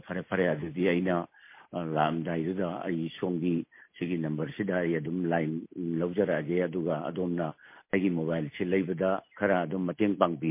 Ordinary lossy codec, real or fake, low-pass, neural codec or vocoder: MP3, 24 kbps; real; 3.6 kHz; none